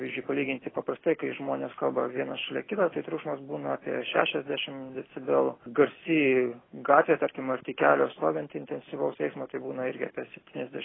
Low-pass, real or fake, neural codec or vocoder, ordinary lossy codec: 7.2 kHz; real; none; AAC, 16 kbps